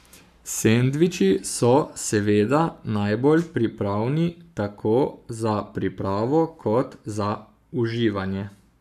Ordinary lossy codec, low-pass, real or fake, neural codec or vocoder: none; 14.4 kHz; fake; codec, 44.1 kHz, 7.8 kbps, Pupu-Codec